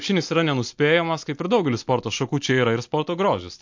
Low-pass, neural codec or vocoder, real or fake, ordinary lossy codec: 7.2 kHz; none; real; MP3, 48 kbps